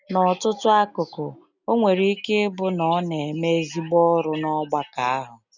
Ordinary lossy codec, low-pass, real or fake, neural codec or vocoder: none; 7.2 kHz; real; none